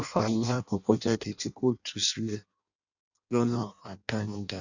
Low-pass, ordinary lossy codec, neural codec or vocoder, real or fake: 7.2 kHz; none; codec, 16 kHz in and 24 kHz out, 0.6 kbps, FireRedTTS-2 codec; fake